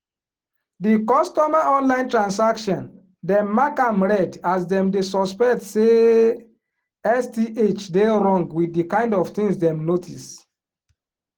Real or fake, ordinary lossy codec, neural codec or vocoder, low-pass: real; Opus, 16 kbps; none; 19.8 kHz